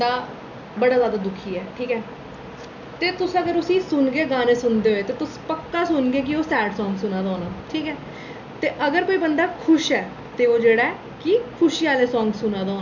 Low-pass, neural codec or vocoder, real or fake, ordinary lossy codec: 7.2 kHz; none; real; none